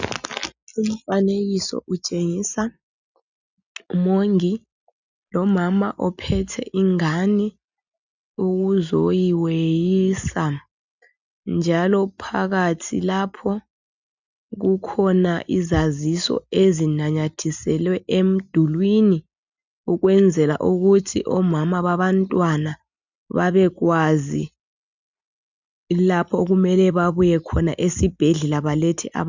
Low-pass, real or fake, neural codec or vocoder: 7.2 kHz; real; none